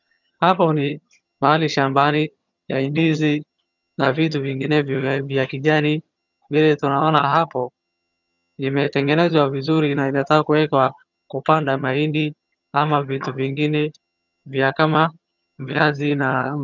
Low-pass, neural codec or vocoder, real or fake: 7.2 kHz; vocoder, 22.05 kHz, 80 mel bands, HiFi-GAN; fake